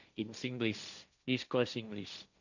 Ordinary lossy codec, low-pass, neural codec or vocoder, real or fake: none; 7.2 kHz; codec, 16 kHz, 1.1 kbps, Voila-Tokenizer; fake